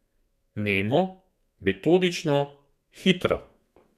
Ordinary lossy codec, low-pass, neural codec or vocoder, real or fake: none; 14.4 kHz; codec, 32 kHz, 1.9 kbps, SNAC; fake